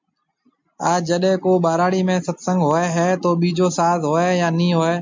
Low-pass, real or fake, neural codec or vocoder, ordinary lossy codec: 7.2 kHz; real; none; MP3, 64 kbps